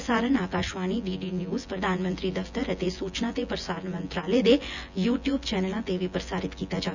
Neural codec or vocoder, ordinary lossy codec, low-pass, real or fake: vocoder, 24 kHz, 100 mel bands, Vocos; none; 7.2 kHz; fake